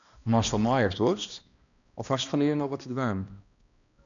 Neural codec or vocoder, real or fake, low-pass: codec, 16 kHz, 1 kbps, X-Codec, HuBERT features, trained on balanced general audio; fake; 7.2 kHz